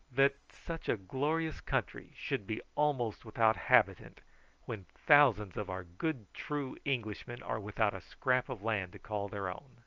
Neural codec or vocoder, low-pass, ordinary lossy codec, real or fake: none; 7.2 kHz; Opus, 24 kbps; real